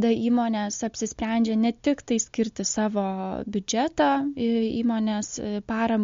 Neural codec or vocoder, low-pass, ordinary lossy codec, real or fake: none; 7.2 kHz; MP3, 48 kbps; real